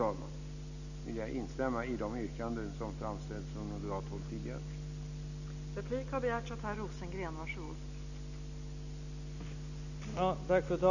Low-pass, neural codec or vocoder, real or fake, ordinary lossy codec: 7.2 kHz; none; real; none